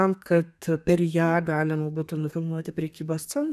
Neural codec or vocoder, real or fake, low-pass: codec, 32 kHz, 1.9 kbps, SNAC; fake; 14.4 kHz